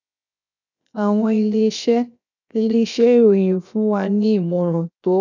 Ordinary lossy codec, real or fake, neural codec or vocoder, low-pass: none; fake; codec, 16 kHz, 0.7 kbps, FocalCodec; 7.2 kHz